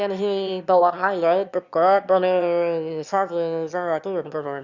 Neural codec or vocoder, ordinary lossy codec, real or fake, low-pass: autoencoder, 22.05 kHz, a latent of 192 numbers a frame, VITS, trained on one speaker; Opus, 64 kbps; fake; 7.2 kHz